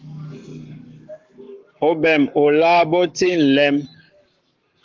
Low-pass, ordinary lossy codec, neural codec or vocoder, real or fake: 7.2 kHz; Opus, 24 kbps; codec, 44.1 kHz, 7.8 kbps, Pupu-Codec; fake